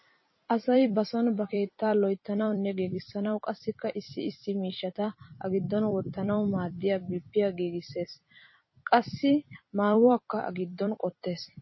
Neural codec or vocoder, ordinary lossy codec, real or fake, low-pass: vocoder, 44.1 kHz, 128 mel bands every 256 samples, BigVGAN v2; MP3, 24 kbps; fake; 7.2 kHz